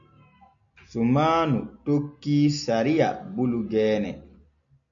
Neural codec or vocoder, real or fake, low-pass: none; real; 7.2 kHz